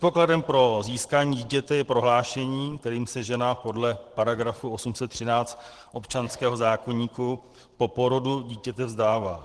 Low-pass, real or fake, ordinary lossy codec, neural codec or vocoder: 10.8 kHz; fake; Opus, 16 kbps; vocoder, 44.1 kHz, 128 mel bands every 512 samples, BigVGAN v2